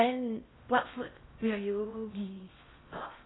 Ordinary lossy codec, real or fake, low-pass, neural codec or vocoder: AAC, 16 kbps; fake; 7.2 kHz; codec, 16 kHz in and 24 kHz out, 0.6 kbps, FocalCodec, streaming, 4096 codes